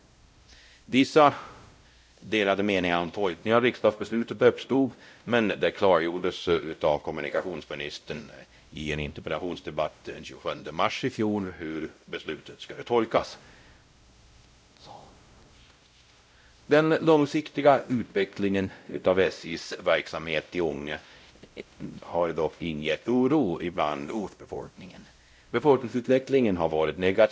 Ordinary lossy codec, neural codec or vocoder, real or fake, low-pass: none; codec, 16 kHz, 0.5 kbps, X-Codec, WavLM features, trained on Multilingual LibriSpeech; fake; none